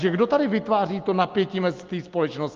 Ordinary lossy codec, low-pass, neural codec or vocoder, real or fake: Opus, 32 kbps; 7.2 kHz; none; real